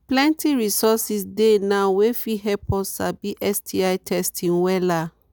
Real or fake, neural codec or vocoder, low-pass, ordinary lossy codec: real; none; none; none